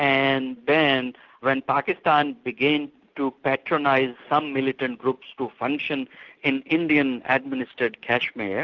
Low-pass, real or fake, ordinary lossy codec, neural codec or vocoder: 7.2 kHz; real; Opus, 32 kbps; none